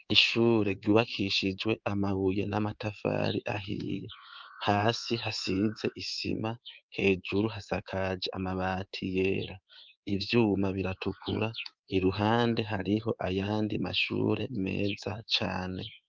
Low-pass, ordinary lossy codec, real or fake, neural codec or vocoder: 7.2 kHz; Opus, 24 kbps; fake; codec, 24 kHz, 3.1 kbps, DualCodec